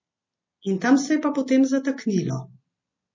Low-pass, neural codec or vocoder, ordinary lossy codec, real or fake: 7.2 kHz; none; MP3, 32 kbps; real